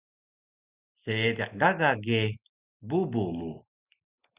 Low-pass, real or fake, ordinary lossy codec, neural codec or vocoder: 3.6 kHz; real; Opus, 64 kbps; none